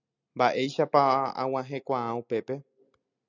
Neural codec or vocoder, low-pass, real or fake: none; 7.2 kHz; real